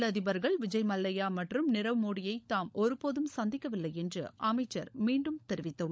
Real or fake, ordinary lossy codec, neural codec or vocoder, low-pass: fake; none; codec, 16 kHz, 16 kbps, FunCodec, trained on LibriTTS, 50 frames a second; none